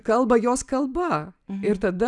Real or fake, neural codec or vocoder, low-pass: real; none; 10.8 kHz